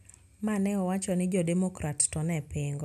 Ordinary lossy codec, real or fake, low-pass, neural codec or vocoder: none; real; 14.4 kHz; none